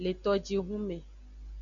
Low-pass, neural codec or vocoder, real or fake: 7.2 kHz; none; real